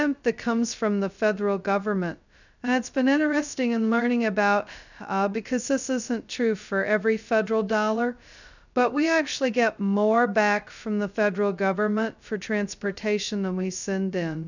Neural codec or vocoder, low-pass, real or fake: codec, 16 kHz, 0.2 kbps, FocalCodec; 7.2 kHz; fake